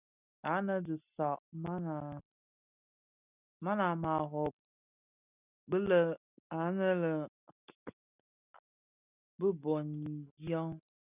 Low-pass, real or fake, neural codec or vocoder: 3.6 kHz; real; none